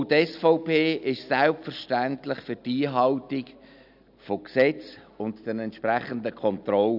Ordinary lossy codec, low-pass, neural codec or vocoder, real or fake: none; 5.4 kHz; none; real